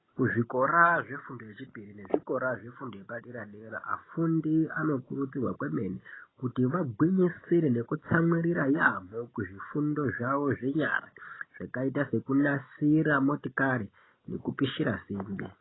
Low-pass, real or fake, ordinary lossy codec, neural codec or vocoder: 7.2 kHz; real; AAC, 16 kbps; none